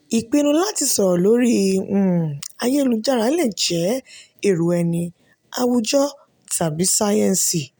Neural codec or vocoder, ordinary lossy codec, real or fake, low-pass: none; none; real; none